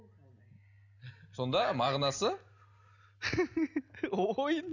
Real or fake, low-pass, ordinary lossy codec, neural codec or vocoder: real; 7.2 kHz; none; none